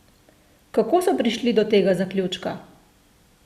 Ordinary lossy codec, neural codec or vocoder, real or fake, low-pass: Opus, 64 kbps; none; real; 14.4 kHz